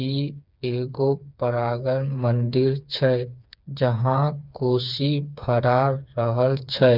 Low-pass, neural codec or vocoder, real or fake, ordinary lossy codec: 5.4 kHz; codec, 16 kHz, 4 kbps, FreqCodec, smaller model; fake; none